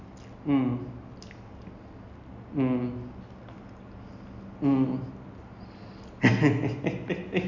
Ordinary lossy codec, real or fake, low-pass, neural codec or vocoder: none; real; 7.2 kHz; none